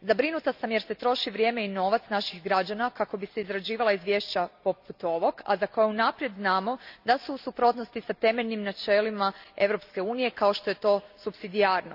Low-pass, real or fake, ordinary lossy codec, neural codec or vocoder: 5.4 kHz; real; none; none